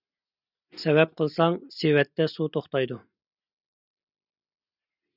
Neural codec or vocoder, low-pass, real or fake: none; 5.4 kHz; real